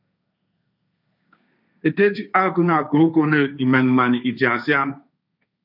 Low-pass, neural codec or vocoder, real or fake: 5.4 kHz; codec, 16 kHz, 1.1 kbps, Voila-Tokenizer; fake